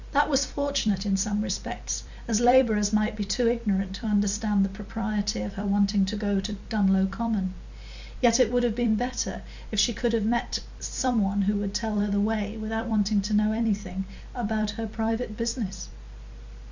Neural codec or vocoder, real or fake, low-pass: vocoder, 44.1 kHz, 128 mel bands every 256 samples, BigVGAN v2; fake; 7.2 kHz